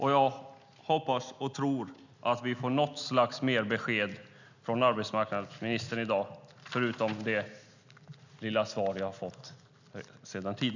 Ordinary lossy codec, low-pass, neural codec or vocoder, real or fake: none; 7.2 kHz; none; real